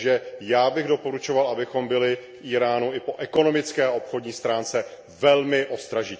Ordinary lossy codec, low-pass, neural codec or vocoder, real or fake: none; none; none; real